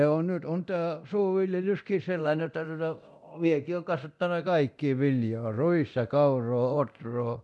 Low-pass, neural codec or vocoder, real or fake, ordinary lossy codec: none; codec, 24 kHz, 0.9 kbps, DualCodec; fake; none